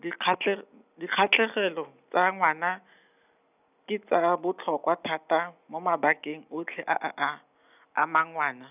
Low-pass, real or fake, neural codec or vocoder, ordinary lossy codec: 3.6 kHz; real; none; none